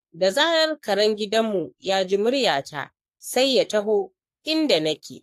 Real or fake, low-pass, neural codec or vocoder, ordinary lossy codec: fake; 14.4 kHz; codec, 44.1 kHz, 3.4 kbps, Pupu-Codec; AAC, 64 kbps